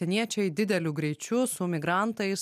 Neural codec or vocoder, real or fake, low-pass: none; real; 14.4 kHz